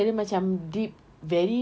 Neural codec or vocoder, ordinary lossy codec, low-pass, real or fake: none; none; none; real